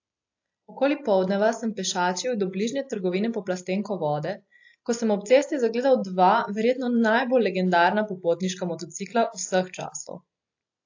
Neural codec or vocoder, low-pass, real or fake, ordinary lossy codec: none; 7.2 kHz; real; AAC, 48 kbps